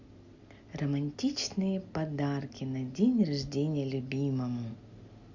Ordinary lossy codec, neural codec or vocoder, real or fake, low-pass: Opus, 64 kbps; none; real; 7.2 kHz